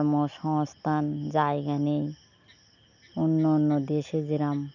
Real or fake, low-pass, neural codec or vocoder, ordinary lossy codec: real; 7.2 kHz; none; none